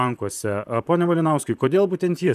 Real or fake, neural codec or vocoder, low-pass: fake; vocoder, 44.1 kHz, 128 mel bands, Pupu-Vocoder; 14.4 kHz